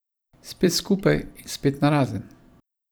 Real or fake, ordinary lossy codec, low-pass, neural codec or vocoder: fake; none; none; vocoder, 44.1 kHz, 128 mel bands every 512 samples, BigVGAN v2